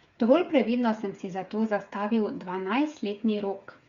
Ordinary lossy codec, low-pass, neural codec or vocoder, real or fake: Opus, 64 kbps; 7.2 kHz; codec, 16 kHz, 8 kbps, FreqCodec, smaller model; fake